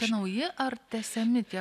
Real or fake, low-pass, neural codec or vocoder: real; 14.4 kHz; none